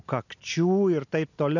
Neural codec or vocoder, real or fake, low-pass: none; real; 7.2 kHz